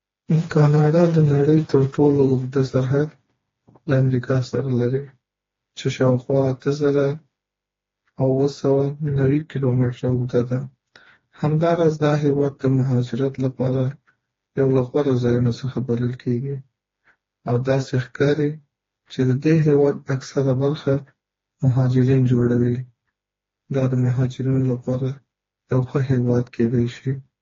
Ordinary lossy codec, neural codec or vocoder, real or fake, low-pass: AAC, 32 kbps; codec, 16 kHz, 2 kbps, FreqCodec, smaller model; fake; 7.2 kHz